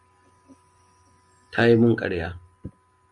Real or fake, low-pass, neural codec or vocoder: real; 10.8 kHz; none